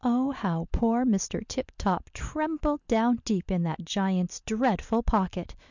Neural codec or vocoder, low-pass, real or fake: none; 7.2 kHz; real